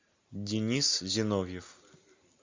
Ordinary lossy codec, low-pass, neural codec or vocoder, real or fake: MP3, 64 kbps; 7.2 kHz; none; real